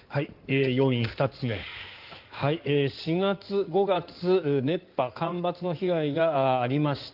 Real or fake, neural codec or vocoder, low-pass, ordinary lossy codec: fake; codec, 16 kHz in and 24 kHz out, 2.2 kbps, FireRedTTS-2 codec; 5.4 kHz; Opus, 32 kbps